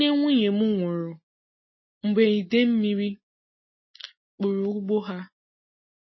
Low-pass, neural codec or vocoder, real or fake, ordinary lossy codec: 7.2 kHz; none; real; MP3, 24 kbps